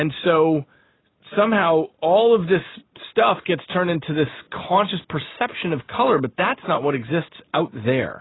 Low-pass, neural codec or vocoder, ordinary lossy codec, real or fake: 7.2 kHz; none; AAC, 16 kbps; real